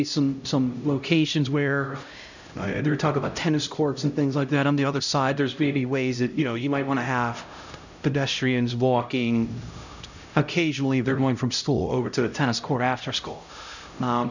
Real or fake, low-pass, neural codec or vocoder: fake; 7.2 kHz; codec, 16 kHz, 0.5 kbps, X-Codec, HuBERT features, trained on LibriSpeech